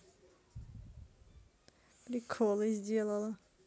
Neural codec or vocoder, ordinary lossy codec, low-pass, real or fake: none; none; none; real